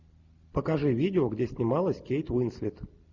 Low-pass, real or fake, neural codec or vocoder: 7.2 kHz; real; none